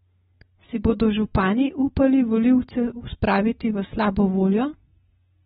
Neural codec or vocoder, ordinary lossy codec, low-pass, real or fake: none; AAC, 16 kbps; 14.4 kHz; real